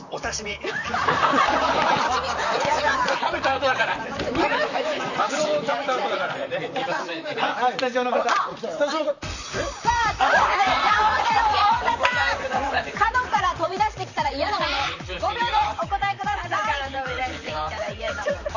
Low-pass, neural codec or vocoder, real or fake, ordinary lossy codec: 7.2 kHz; vocoder, 44.1 kHz, 128 mel bands, Pupu-Vocoder; fake; none